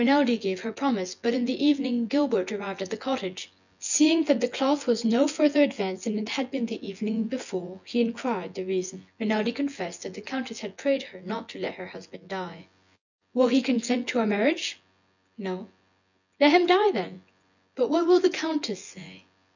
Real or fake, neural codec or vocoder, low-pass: fake; vocoder, 24 kHz, 100 mel bands, Vocos; 7.2 kHz